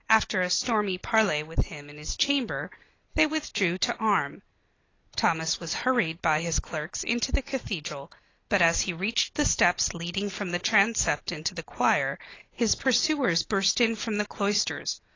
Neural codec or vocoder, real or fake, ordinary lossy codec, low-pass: none; real; AAC, 32 kbps; 7.2 kHz